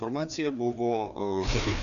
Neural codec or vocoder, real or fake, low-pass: codec, 16 kHz, 2 kbps, FreqCodec, larger model; fake; 7.2 kHz